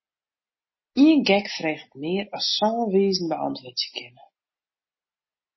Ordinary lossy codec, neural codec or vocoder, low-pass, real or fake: MP3, 24 kbps; none; 7.2 kHz; real